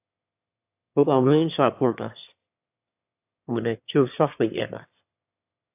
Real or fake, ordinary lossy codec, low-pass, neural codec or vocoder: fake; AAC, 24 kbps; 3.6 kHz; autoencoder, 22.05 kHz, a latent of 192 numbers a frame, VITS, trained on one speaker